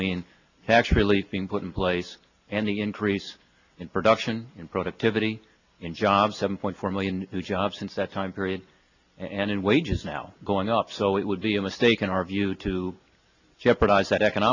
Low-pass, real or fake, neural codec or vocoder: 7.2 kHz; real; none